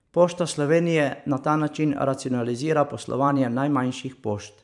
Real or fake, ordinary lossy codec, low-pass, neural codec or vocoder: fake; none; 10.8 kHz; vocoder, 44.1 kHz, 128 mel bands every 512 samples, BigVGAN v2